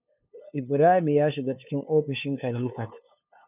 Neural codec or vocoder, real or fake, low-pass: codec, 16 kHz, 2 kbps, FunCodec, trained on LibriTTS, 25 frames a second; fake; 3.6 kHz